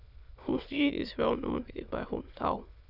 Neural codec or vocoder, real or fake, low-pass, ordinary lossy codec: autoencoder, 22.05 kHz, a latent of 192 numbers a frame, VITS, trained on many speakers; fake; 5.4 kHz; none